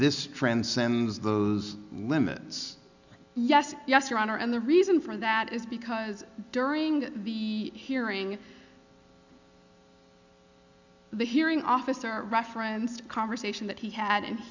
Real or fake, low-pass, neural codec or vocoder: real; 7.2 kHz; none